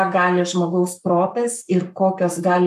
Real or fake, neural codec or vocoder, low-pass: fake; codec, 44.1 kHz, 7.8 kbps, Pupu-Codec; 14.4 kHz